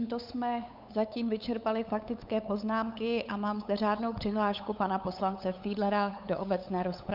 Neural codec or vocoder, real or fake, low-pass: codec, 16 kHz, 8 kbps, FunCodec, trained on LibriTTS, 25 frames a second; fake; 5.4 kHz